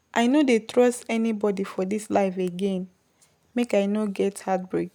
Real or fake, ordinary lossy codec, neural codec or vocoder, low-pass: real; none; none; 19.8 kHz